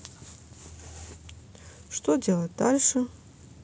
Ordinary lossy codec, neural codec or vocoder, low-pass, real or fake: none; none; none; real